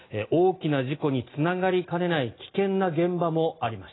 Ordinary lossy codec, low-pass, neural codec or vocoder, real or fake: AAC, 16 kbps; 7.2 kHz; none; real